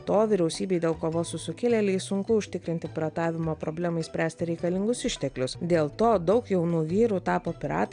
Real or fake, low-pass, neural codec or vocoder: real; 9.9 kHz; none